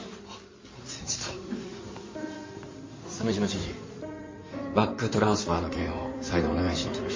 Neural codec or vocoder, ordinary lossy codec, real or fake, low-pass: codec, 16 kHz in and 24 kHz out, 2.2 kbps, FireRedTTS-2 codec; MP3, 32 kbps; fake; 7.2 kHz